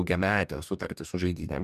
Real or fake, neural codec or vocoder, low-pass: fake; codec, 44.1 kHz, 2.6 kbps, DAC; 14.4 kHz